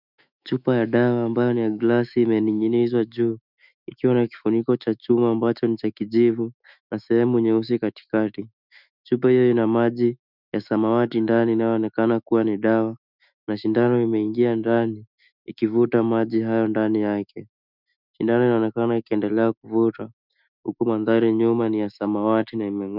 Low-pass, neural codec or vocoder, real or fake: 5.4 kHz; autoencoder, 48 kHz, 128 numbers a frame, DAC-VAE, trained on Japanese speech; fake